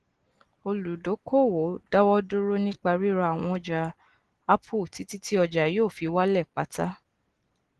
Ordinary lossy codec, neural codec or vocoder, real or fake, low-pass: Opus, 16 kbps; none; real; 10.8 kHz